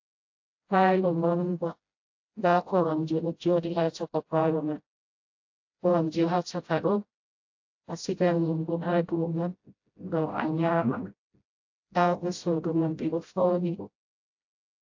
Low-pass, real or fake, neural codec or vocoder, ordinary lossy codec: 7.2 kHz; fake; codec, 16 kHz, 0.5 kbps, FreqCodec, smaller model; AAC, 48 kbps